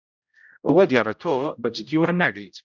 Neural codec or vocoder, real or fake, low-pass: codec, 16 kHz, 0.5 kbps, X-Codec, HuBERT features, trained on general audio; fake; 7.2 kHz